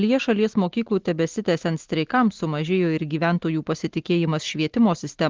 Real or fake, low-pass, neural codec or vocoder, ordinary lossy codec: real; 7.2 kHz; none; Opus, 16 kbps